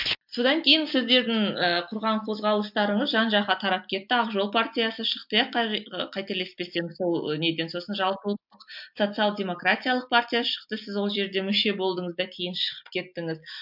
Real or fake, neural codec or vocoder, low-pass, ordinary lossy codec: real; none; 5.4 kHz; MP3, 48 kbps